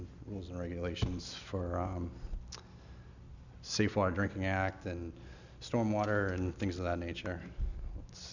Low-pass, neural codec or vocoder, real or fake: 7.2 kHz; none; real